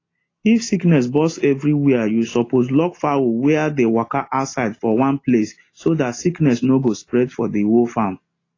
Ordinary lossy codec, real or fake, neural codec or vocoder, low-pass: AAC, 32 kbps; real; none; 7.2 kHz